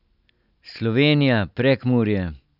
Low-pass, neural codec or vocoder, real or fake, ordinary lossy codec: 5.4 kHz; none; real; none